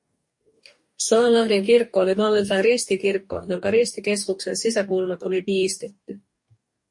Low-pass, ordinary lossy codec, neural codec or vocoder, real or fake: 10.8 kHz; MP3, 48 kbps; codec, 44.1 kHz, 2.6 kbps, DAC; fake